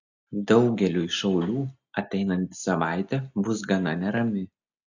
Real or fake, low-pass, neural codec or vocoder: real; 7.2 kHz; none